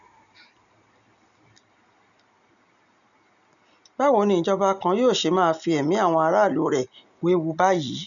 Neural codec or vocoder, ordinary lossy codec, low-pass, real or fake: none; none; 7.2 kHz; real